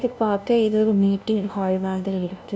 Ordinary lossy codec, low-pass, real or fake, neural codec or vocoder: none; none; fake; codec, 16 kHz, 0.5 kbps, FunCodec, trained on LibriTTS, 25 frames a second